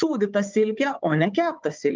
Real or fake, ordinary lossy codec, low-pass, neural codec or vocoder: fake; Opus, 32 kbps; 7.2 kHz; codec, 16 kHz, 4 kbps, FunCodec, trained on Chinese and English, 50 frames a second